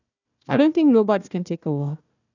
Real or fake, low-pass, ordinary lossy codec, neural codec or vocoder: fake; 7.2 kHz; none; codec, 16 kHz, 1 kbps, FunCodec, trained on Chinese and English, 50 frames a second